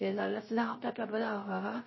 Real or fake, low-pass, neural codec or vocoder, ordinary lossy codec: fake; 7.2 kHz; codec, 16 kHz, 0.3 kbps, FocalCodec; MP3, 24 kbps